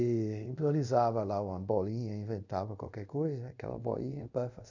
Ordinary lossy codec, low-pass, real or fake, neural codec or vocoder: none; 7.2 kHz; fake; codec, 24 kHz, 0.5 kbps, DualCodec